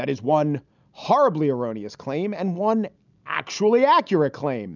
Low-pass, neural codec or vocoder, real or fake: 7.2 kHz; none; real